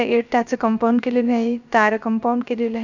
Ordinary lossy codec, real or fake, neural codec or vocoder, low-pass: none; fake; codec, 16 kHz, 0.3 kbps, FocalCodec; 7.2 kHz